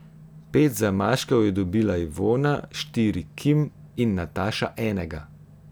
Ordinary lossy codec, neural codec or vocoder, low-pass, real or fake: none; none; none; real